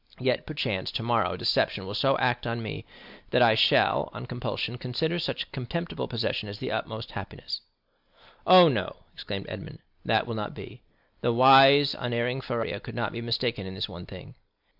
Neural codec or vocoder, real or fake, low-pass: none; real; 5.4 kHz